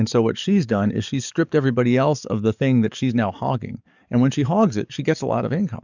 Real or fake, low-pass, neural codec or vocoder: fake; 7.2 kHz; codec, 44.1 kHz, 7.8 kbps, DAC